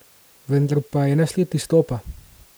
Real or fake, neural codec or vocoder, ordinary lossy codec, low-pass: real; none; none; none